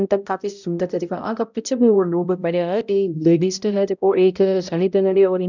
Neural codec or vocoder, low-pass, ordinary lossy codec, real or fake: codec, 16 kHz, 0.5 kbps, X-Codec, HuBERT features, trained on balanced general audio; 7.2 kHz; none; fake